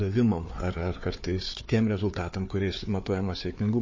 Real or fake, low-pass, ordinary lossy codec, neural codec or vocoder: fake; 7.2 kHz; MP3, 32 kbps; codec, 16 kHz, 4 kbps, FunCodec, trained on Chinese and English, 50 frames a second